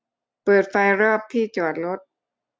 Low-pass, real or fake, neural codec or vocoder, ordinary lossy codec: none; real; none; none